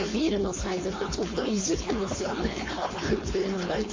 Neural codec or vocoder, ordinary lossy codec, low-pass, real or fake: codec, 16 kHz, 4.8 kbps, FACodec; MP3, 32 kbps; 7.2 kHz; fake